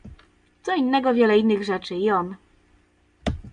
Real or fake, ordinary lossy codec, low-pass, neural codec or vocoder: real; MP3, 96 kbps; 9.9 kHz; none